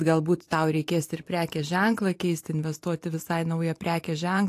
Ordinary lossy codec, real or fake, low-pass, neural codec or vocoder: AAC, 64 kbps; fake; 14.4 kHz; vocoder, 44.1 kHz, 128 mel bands every 512 samples, BigVGAN v2